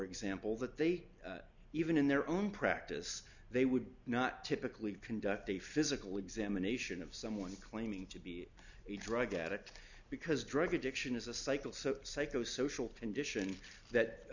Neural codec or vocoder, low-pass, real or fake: none; 7.2 kHz; real